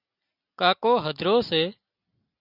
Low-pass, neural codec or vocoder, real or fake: 5.4 kHz; none; real